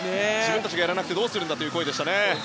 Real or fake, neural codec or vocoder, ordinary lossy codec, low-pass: real; none; none; none